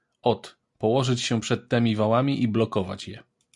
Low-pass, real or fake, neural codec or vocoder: 10.8 kHz; real; none